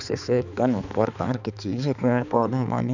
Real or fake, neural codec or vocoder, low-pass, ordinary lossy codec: fake; codec, 16 kHz, 4 kbps, X-Codec, HuBERT features, trained on balanced general audio; 7.2 kHz; none